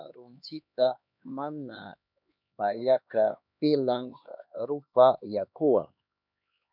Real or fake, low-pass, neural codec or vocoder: fake; 5.4 kHz; codec, 16 kHz, 2 kbps, X-Codec, HuBERT features, trained on LibriSpeech